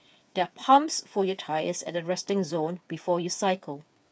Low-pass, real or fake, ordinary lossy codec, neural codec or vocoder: none; fake; none; codec, 16 kHz, 8 kbps, FreqCodec, smaller model